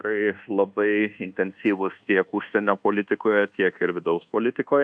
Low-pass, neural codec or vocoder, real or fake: 9.9 kHz; codec, 24 kHz, 1.2 kbps, DualCodec; fake